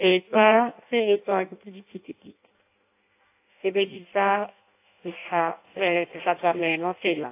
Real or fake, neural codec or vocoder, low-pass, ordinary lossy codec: fake; codec, 16 kHz in and 24 kHz out, 0.6 kbps, FireRedTTS-2 codec; 3.6 kHz; AAC, 24 kbps